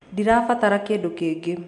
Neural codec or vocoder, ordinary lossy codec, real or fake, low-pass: none; none; real; 10.8 kHz